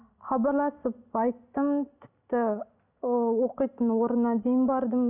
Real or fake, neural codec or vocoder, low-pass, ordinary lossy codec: real; none; 3.6 kHz; none